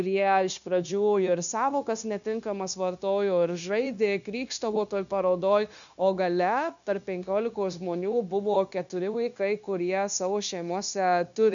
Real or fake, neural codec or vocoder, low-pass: fake; codec, 16 kHz, 0.9 kbps, LongCat-Audio-Codec; 7.2 kHz